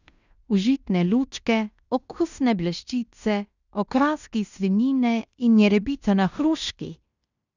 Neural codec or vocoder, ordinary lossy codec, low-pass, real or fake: codec, 16 kHz in and 24 kHz out, 0.9 kbps, LongCat-Audio-Codec, fine tuned four codebook decoder; none; 7.2 kHz; fake